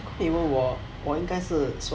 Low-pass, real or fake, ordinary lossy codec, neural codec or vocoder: none; real; none; none